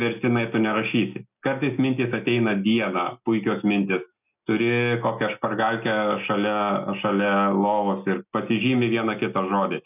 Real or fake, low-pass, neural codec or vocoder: real; 3.6 kHz; none